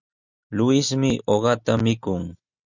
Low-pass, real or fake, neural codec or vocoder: 7.2 kHz; real; none